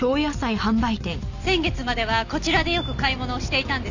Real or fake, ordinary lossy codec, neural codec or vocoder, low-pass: real; none; none; 7.2 kHz